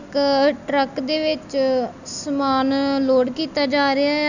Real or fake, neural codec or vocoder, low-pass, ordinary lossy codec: real; none; 7.2 kHz; none